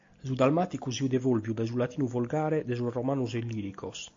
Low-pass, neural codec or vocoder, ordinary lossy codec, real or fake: 7.2 kHz; none; AAC, 48 kbps; real